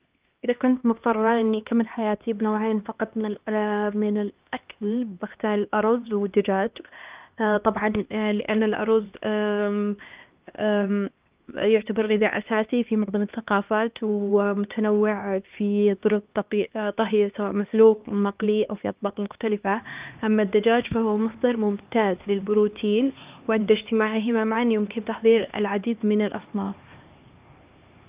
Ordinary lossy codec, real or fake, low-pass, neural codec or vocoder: Opus, 24 kbps; fake; 3.6 kHz; codec, 16 kHz, 2 kbps, X-Codec, HuBERT features, trained on LibriSpeech